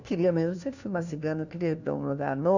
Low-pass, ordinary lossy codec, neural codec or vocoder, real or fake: 7.2 kHz; none; codec, 16 kHz, 1 kbps, FunCodec, trained on LibriTTS, 50 frames a second; fake